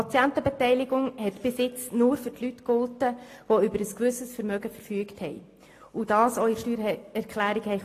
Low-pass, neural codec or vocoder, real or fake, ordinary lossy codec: 14.4 kHz; none; real; AAC, 48 kbps